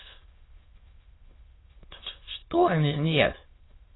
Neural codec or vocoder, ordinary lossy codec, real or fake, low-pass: autoencoder, 22.05 kHz, a latent of 192 numbers a frame, VITS, trained on many speakers; AAC, 16 kbps; fake; 7.2 kHz